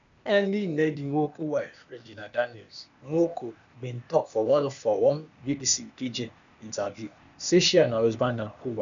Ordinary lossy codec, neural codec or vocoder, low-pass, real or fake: none; codec, 16 kHz, 0.8 kbps, ZipCodec; 7.2 kHz; fake